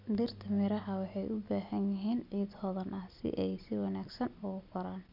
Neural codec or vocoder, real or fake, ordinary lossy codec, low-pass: none; real; none; 5.4 kHz